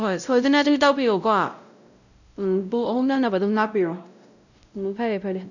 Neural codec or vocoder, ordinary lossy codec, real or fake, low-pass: codec, 16 kHz, 0.5 kbps, X-Codec, WavLM features, trained on Multilingual LibriSpeech; none; fake; 7.2 kHz